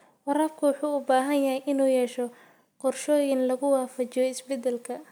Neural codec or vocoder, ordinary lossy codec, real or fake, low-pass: none; none; real; none